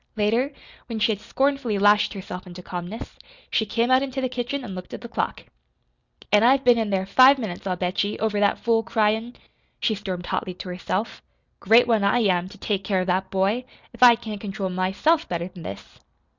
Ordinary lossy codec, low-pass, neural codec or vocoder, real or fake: Opus, 64 kbps; 7.2 kHz; none; real